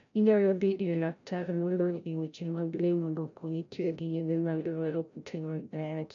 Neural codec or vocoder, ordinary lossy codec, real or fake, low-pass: codec, 16 kHz, 0.5 kbps, FreqCodec, larger model; none; fake; 7.2 kHz